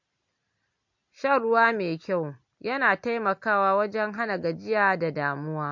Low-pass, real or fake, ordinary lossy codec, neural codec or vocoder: 7.2 kHz; real; MP3, 48 kbps; none